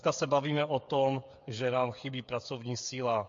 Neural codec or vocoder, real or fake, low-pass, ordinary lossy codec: codec, 16 kHz, 8 kbps, FreqCodec, smaller model; fake; 7.2 kHz; MP3, 48 kbps